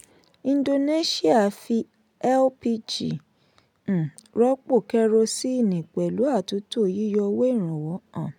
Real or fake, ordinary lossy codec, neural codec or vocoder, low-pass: real; none; none; 19.8 kHz